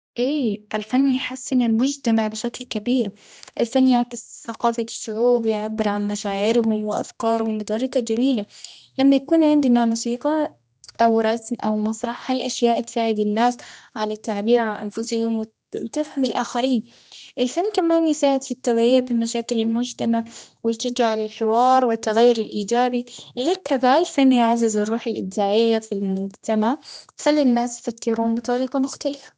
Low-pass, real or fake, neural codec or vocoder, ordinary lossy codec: none; fake; codec, 16 kHz, 1 kbps, X-Codec, HuBERT features, trained on general audio; none